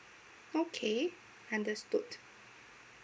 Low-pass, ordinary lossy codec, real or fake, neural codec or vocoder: none; none; real; none